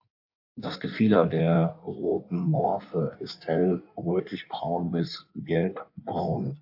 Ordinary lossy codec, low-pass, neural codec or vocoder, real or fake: AAC, 48 kbps; 5.4 kHz; codec, 16 kHz in and 24 kHz out, 1.1 kbps, FireRedTTS-2 codec; fake